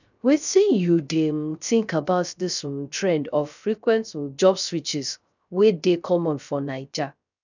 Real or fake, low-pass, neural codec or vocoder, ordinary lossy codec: fake; 7.2 kHz; codec, 16 kHz, 0.3 kbps, FocalCodec; none